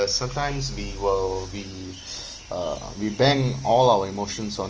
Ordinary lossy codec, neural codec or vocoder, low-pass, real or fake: Opus, 24 kbps; none; 7.2 kHz; real